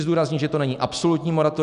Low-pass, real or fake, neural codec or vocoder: 10.8 kHz; real; none